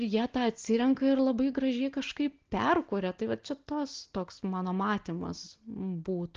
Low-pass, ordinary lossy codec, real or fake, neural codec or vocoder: 7.2 kHz; Opus, 16 kbps; real; none